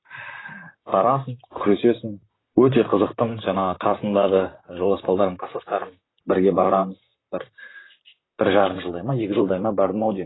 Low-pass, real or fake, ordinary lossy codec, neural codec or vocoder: 7.2 kHz; fake; AAC, 16 kbps; vocoder, 44.1 kHz, 128 mel bands, Pupu-Vocoder